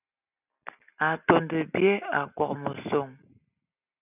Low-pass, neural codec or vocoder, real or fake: 3.6 kHz; none; real